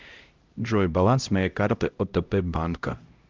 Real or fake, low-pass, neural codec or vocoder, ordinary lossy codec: fake; 7.2 kHz; codec, 16 kHz, 0.5 kbps, X-Codec, HuBERT features, trained on LibriSpeech; Opus, 32 kbps